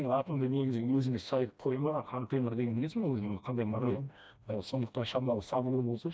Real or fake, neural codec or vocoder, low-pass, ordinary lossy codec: fake; codec, 16 kHz, 1 kbps, FreqCodec, smaller model; none; none